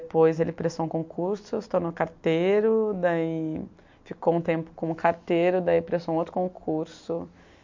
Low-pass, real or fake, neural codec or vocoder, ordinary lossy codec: 7.2 kHz; real; none; MP3, 48 kbps